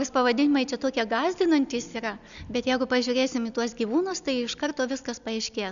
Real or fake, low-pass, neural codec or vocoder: real; 7.2 kHz; none